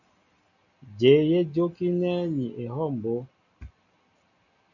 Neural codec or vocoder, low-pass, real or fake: none; 7.2 kHz; real